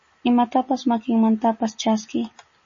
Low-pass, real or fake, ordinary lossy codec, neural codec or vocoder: 7.2 kHz; real; MP3, 32 kbps; none